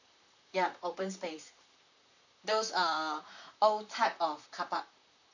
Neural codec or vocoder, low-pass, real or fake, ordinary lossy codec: vocoder, 44.1 kHz, 128 mel bands, Pupu-Vocoder; 7.2 kHz; fake; none